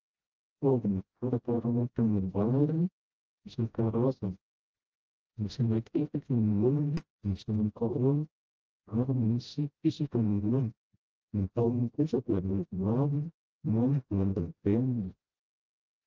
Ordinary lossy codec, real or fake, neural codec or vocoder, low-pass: Opus, 24 kbps; fake; codec, 16 kHz, 0.5 kbps, FreqCodec, smaller model; 7.2 kHz